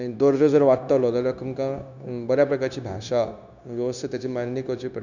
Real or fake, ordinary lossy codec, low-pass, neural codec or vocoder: fake; none; 7.2 kHz; codec, 16 kHz, 0.9 kbps, LongCat-Audio-Codec